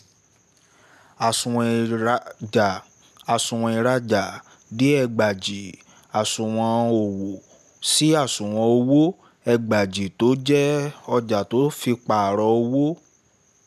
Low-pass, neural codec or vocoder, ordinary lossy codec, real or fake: 14.4 kHz; none; none; real